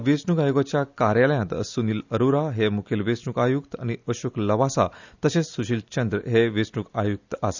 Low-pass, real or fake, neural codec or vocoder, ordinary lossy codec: 7.2 kHz; real; none; none